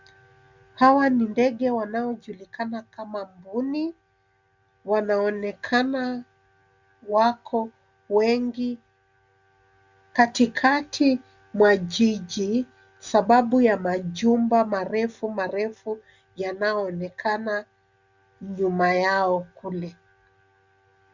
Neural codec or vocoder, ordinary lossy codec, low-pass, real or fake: none; Opus, 64 kbps; 7.2 kHz; real